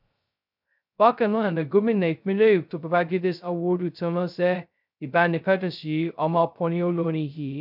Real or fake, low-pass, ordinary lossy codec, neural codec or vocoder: fake; 5.4 kHz; none; codec, 16 kHz, 0.2 kbps, FocalCodec